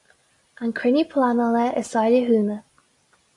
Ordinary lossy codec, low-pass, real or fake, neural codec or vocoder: AAC, 64 kbps; 10.8 kHz; real; none